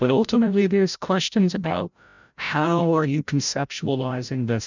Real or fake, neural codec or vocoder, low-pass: fake; codec, 16 kHz, 0.5 kbps, FreqCodec, larger model; 7.2 kHz